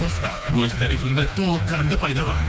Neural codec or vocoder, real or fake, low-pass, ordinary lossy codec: codec, 16 kHz, 2 kbps, FreqCodec, smaller model; fake; none; none